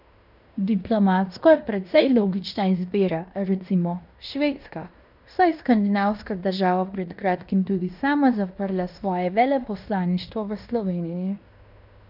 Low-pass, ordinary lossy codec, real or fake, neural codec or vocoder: 5.4 kHz; none; fake; codec, 16 kHz in and 24 kHz out, 0.9 kbps, LongCat-Audio-Codec, fine tuned four codebook decoder